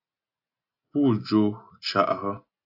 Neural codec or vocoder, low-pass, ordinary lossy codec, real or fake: none; 5.4 kHz; AAC, 48 kbps; real